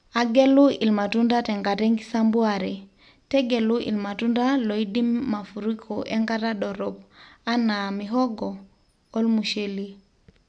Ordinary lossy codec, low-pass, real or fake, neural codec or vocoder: none; 9.9 kHz; real; none